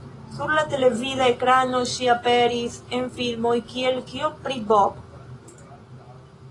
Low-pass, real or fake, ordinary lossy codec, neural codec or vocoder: 10.8 kHz; real; AAC, 32 kbps; none